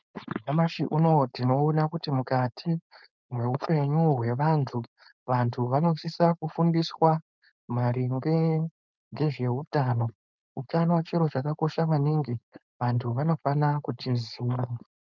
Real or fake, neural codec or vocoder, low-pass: fake; codec, 16 kHz, 4.8 kbps, FACodec; 7.2 kHz